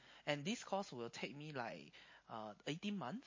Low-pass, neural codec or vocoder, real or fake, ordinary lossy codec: 7.2 kHz; none; real; MP3, 32 kbps